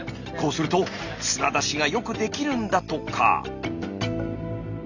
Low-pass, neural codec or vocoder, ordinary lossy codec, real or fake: 7.2 kHz; none; none; real